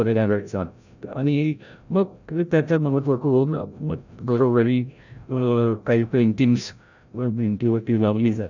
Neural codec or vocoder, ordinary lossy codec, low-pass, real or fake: codec, 16 kHz, 0.5 kbps, FreqCodec, larger model; none; 7.2 kHz; fake